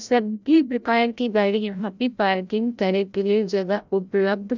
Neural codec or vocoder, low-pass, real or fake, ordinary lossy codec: codec, 16 kHz, 0.5 kbps, FreqCodec, larger model; 7.2 kHz; fake; none